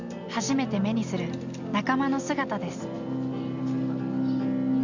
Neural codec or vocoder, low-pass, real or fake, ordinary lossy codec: none; 7.2 kHz; real; Opus, 64 kbps